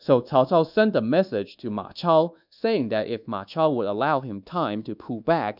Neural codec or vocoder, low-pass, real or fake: codec, 24 kHz, 1.2 kbps, DualCodec; 5.4 kHz; fake